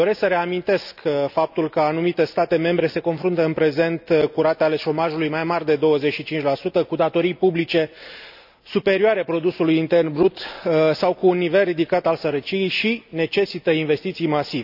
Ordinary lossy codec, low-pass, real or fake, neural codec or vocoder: none; 5.4 kHz; real; none